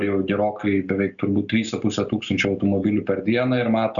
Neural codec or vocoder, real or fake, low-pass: none; real; 7.2 kHz